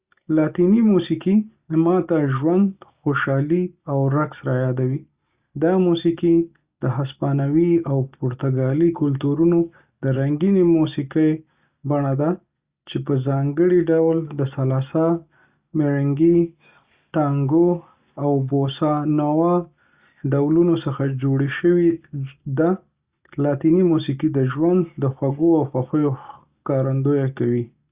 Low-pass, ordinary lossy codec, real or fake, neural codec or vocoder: 3.6 kHz; Opus, 64 kbps; real; none